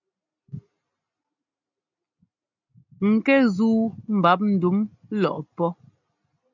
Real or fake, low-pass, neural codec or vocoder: real; 7.2 kHz; none